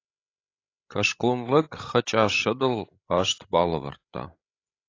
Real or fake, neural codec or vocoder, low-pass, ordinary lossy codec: fake; codec, 16 kHz, 16 kbps, FreqCodec, larger model; 7.2 kHz; AAC, 32 kbps